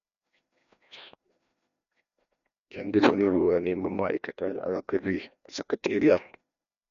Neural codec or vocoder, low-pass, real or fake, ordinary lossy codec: codec, 16 kHz, 1 kbps, FreqCodec, larger model; 7.2 kHz; fake; none